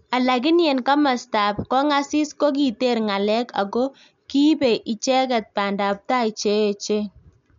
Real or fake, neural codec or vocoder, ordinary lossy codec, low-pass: real; none; MP3, 64 kbps; 7.2 kHz